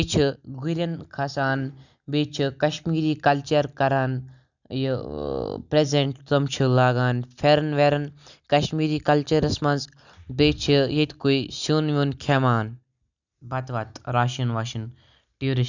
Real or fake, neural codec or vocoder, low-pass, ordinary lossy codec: real; none; 7.2 kHz; none